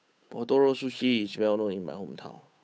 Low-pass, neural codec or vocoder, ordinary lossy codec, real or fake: none; codec, 16 kHz, 8 kbps, FunCodec, trained on Chinese and English, 25 frames a second; none; fake